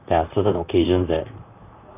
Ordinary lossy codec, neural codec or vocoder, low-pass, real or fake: none; vocoder, 44.1 kHz, 128 mel bands, Pupu-Vocoder; 3.6 kHz; fake